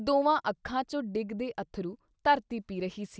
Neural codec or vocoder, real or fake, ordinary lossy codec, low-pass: none; real; none; none